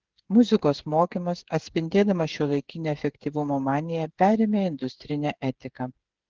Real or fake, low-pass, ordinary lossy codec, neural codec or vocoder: fake; 7.2 kHz; Opus, 16 kbps; codec, 16 kHz, 8 kbps, FreqCodec, smaller model